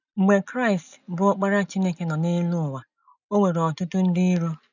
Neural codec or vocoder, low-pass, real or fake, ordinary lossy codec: none; 7.2 kHz; real; none